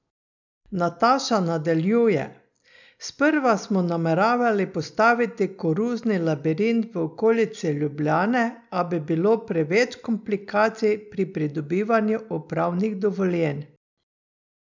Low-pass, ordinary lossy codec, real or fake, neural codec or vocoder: 7.2 kHz; none; real; none